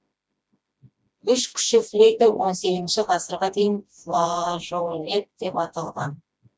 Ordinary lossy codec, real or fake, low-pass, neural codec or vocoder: none; fake; none; codec, 16 kHz, 1 kbps, FreqCodec, smaller model